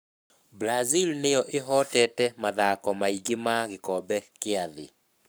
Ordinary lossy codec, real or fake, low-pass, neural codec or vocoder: none; fake; none; codec, 44.1 kHz, 7.8 kbps, Pupu-Codec